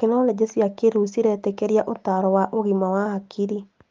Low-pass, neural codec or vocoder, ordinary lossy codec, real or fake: 7.2 kHz; none; Opus, 32 kbps; real